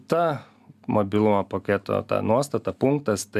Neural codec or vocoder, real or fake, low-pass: none; real; 14.4 kHz